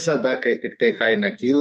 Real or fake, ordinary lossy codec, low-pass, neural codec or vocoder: fake; MP3, 64 kbps; 14.4 kHz; codec, 44.1 kHz, 2.6 kbps, SNAC